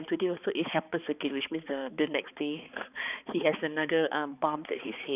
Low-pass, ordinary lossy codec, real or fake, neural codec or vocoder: 3.6 kHz; none; fake; codec, 16 kHz, 4 kbps, X-Codec, HuBERT features, trained on balanced general audio